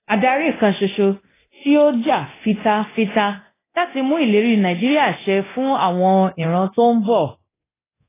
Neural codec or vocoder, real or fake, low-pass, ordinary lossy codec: codec, 24 kHz, 0.9 kbps, DualCodec; fake; 3.6 kHz; AAC, 16 kbps